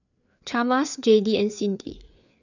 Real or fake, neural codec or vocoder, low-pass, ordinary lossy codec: fake; codec, 16 kHz, 4 kbps, FreqCodec, larger model; 7.2 kHz; none